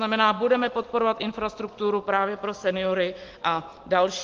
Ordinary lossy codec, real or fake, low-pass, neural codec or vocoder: Opus, 16 kbps; real; 7.2 kHz; none